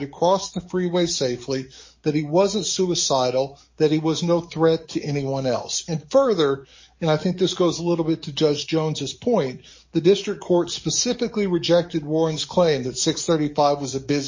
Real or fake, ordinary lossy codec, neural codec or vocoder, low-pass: fake; MP3, 32 kbps; codec, 44.1 kHz, 7.8 kbps, DAC; 7.2 kHz